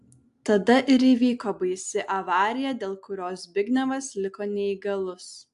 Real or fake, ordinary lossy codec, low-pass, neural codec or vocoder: real; AAC, 64 kbps; 10.8 kHz; none